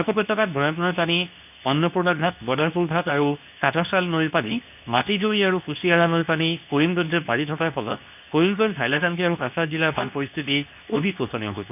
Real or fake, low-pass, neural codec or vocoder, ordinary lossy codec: fake; 3.6 kHz; codec, 24 kHz, 0.9 kbps, WavTokenizer, medium speech release version 2; none